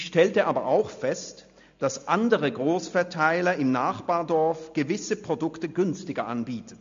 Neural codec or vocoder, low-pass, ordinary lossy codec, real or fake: none; 7.2 kHz; MP3, 48 kbps; real